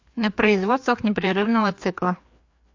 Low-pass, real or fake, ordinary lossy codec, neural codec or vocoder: 7.2 kHz; fake; MP3, 48 kbps; codec, 16 kHz, 2 kbps, FreqCodec, larger model